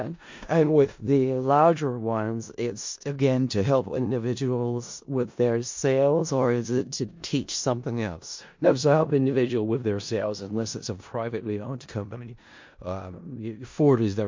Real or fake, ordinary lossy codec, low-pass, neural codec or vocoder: fake; MP3, 48 kbps; 7.2 kHz; codec, 16 kHz in and 24 kHz out, 0.4 kbps, LongCat-Audio-Codec, four codebook decoder